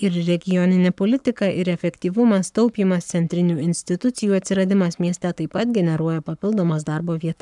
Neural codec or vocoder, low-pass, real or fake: codec, 44.1 kHz, 7.8 kbps, Pupu-Codec; 10.8 kHz; fake